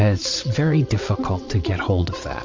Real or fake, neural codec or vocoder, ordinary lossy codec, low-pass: fake; vocoder, 22.05 kHz, 80 mel bands, WaveNeXt; MP3, 48 kbps; 7.2 kHz